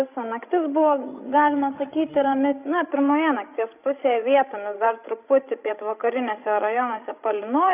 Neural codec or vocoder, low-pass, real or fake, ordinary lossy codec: codec, 16 kHz, 16 kbps, FreqCodec, larger model; 3.6 kHz; fake; AAC, 32 kbps